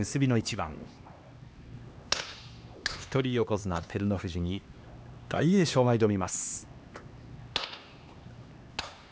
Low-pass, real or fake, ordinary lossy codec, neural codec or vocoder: none; fake; none; codec, 16 kHz, 2 kbps, X-Codec, HuBERT features, trained on LibriSpeech